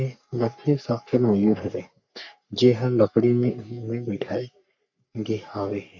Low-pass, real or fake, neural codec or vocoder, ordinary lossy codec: 7.2 kHz; fake; codec, 44.1 kHz, 3.4 kbps, Pupu-Codec; none